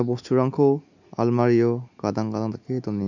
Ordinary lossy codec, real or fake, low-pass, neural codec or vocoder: none; real; 7.2 kHz; none